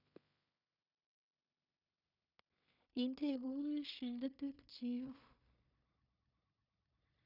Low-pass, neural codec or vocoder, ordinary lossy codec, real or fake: 5.4 kHz; codec, 16 kHz in and 24 kHz out, 0.4 kbps, LongCat-Audio-Codec, two codebook decoder; none; fake